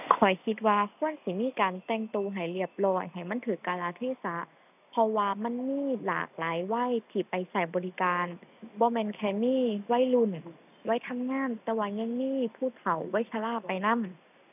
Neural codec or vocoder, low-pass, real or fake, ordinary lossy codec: none; 3.6 kHz; real; none